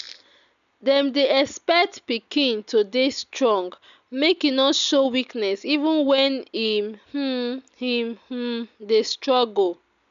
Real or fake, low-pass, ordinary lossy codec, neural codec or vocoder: real; 7.2 kHz; none; none